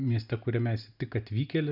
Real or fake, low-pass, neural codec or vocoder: real; 5.4 kHz; none